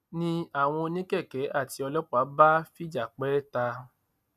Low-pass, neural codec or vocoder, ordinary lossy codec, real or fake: 14.4 kHz; none; none; real